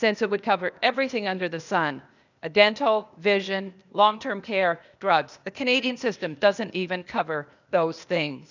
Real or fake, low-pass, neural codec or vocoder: fake; 7.2 kHz; codec, 16 kHz, 0.8 kbps, ZipCodec